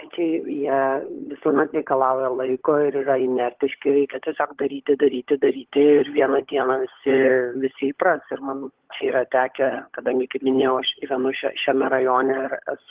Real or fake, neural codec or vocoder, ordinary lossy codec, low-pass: fake; codec, 16 kHz, 16 kbps, FunCodec, trained on LibriTTS, 50 frames a second; Opus, 16 kbps; 3.6 kHz